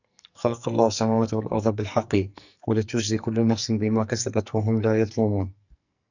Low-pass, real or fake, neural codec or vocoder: 7.2 kHz; fake; codec, 44.1 kHz, 2.6 kbps, SNAC